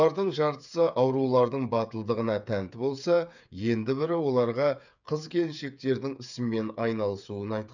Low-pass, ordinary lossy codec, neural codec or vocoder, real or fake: 7.2 kHz; none; codec, 16 kHz, 8 kbps, FreqCodec, smaller model; fake